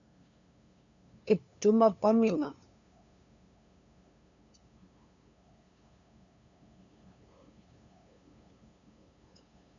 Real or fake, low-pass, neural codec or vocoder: fake; 7.2 kHz; codec, 16 kHz, 2 kbps, FunCodec, trained on LibriTTS, 25 frames a second